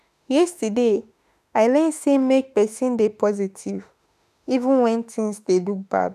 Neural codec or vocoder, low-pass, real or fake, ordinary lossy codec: autoencoder, 48 kHz, 32 numbers a frame, DAC-VAE, trained on Japanese speech; 14.4 kHz; fake; none